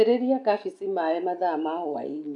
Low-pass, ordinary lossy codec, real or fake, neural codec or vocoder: 10.8 kHz; none; real; none